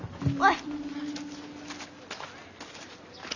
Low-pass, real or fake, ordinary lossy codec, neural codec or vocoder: 7.2 kHz; real; none; none